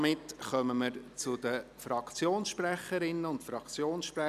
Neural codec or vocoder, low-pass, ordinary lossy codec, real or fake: none; 14.4 kHz; none; real